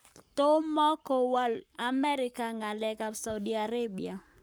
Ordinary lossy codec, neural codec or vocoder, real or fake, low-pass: none; codec, 44.1 kHz, 7.8 kbps, Pupu-Codec; fake; none